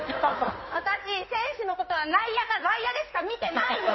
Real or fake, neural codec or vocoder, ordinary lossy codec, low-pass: fake; codec, 16 kHz in and 24 kHz out, 2.2 kbps, FireRedTTS-2 codec; MP3, 24 kbps; 7.2 kHz